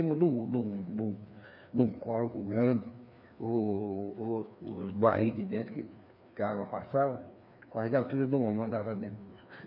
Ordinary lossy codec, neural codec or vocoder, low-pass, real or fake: none; codec, 16 kHz, 2 kbps, FreqCodec, larger model; 5.4 kHz; fake